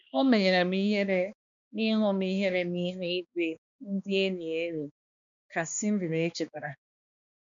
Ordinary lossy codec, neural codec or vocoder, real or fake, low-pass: none; codec, 16 kHz, 2 kbps, X-Codec, HuBERT features, trained on balanced general audio; fake; 7.2 kHz